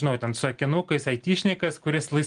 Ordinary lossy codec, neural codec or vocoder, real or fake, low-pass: Opus, 24 kbps; none; real; 9.9 kHz